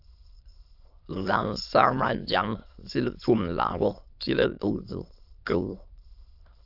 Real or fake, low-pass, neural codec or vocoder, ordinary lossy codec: fake; 5.4 kHz; autoencoder, 22.05 kHz, a latent of 192 numbers a frame, VITS, trained on many speakers; AAC, 48 kbps